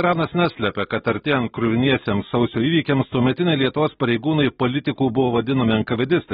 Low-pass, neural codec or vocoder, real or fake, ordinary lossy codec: 19.8 kHz; none; real; AAC, 16 kbps